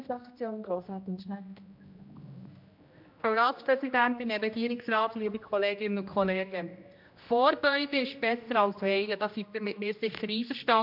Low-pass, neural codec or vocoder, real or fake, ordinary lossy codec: 5.4 kHz; codec, 16 kHz, 1 kbps, X-Codec, HuBERT features, trained on general audio; fake; MP3, 48 kbps